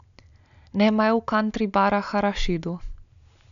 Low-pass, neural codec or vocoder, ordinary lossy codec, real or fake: 7.2 kHz; none; MP3, 96 kbps; real